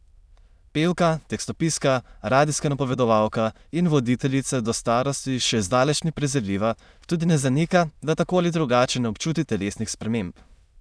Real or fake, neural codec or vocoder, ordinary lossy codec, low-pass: fake; autoencoder, 22.05 kHz, a latent of 192 numbers a frame, VITS, trained on many speakers; none; none